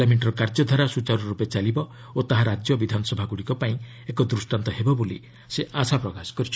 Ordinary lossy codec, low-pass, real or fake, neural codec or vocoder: none; none; real; none